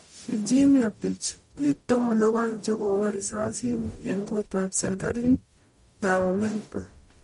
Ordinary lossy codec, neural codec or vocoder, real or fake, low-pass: MP3, 48 kbps; codec, 44.1 kHz, 0.9 kbps, DAC; fake; 19.8 kHz